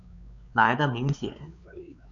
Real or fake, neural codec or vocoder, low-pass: fake; codec, 16 kHz, 4 kbps, X-Codec, WavLM features, trained on Multilingual LibriSpeech; 7.2 kHz